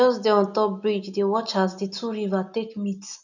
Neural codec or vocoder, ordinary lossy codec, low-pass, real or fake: none; none; 7.2 kHz; real